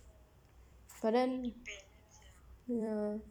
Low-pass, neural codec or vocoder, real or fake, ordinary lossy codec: 19.8 kHz; vocoder, 44.1 kHz, 128 mel bands every 256 samples, BigVGAN v2; fake; none